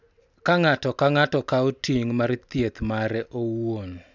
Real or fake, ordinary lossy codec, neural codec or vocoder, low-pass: real; none; none; 7.2 kHz